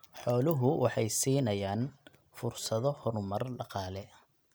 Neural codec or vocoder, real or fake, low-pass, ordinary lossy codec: none; real; none; none